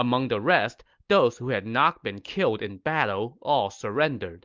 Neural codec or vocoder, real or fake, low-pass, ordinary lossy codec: none; real; 7.2 kHz; Opus, 24 kbps